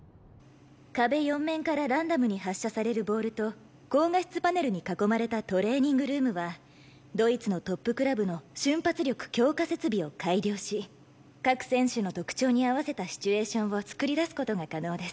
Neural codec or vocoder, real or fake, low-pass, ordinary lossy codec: none; real; none; none